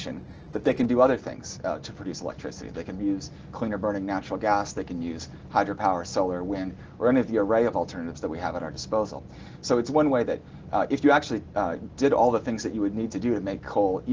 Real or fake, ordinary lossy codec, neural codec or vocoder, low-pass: real; Opus, 16 kbps; none; 7.2 kHz